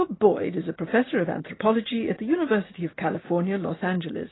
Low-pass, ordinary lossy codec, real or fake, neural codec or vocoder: 7.2 kHz; AAC, 16 kbps; real; none